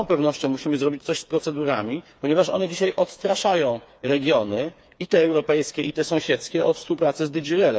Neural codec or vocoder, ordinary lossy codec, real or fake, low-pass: codec, 16 kHz, 4 kbps, FreqCodec, smaller model; none; fake; none